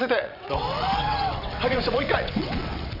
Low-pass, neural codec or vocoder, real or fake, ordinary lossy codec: 5.4 kHz; vocoder, 22.05 kHz, 80 mel bands, WaveNeXt; fake; none